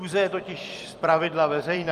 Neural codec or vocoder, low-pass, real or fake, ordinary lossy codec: vocoder, 44.1 kHz, 128 mel bands every 256 samples, BigVGAN v2; 14.4 kHz; fake; Opus, 32 kbps